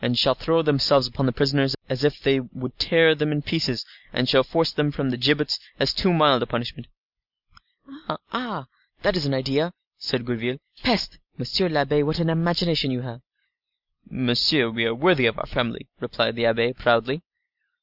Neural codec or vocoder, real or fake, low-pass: none; real; 5.4 kHz